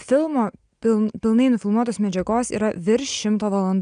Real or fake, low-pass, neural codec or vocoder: real; 9.9 kHz; none